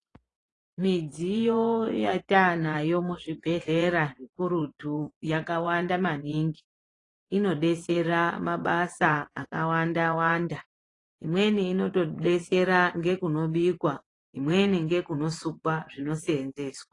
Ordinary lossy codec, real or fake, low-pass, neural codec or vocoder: AAC, 32 kbps; fake; 10.8 kHz; vocoder, 24 kHz, 100 mel bands, Vocos